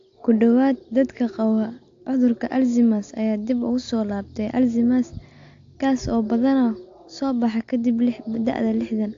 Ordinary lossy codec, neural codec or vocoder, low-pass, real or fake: AAC, 48 kbps; none; 7.2 kHz; real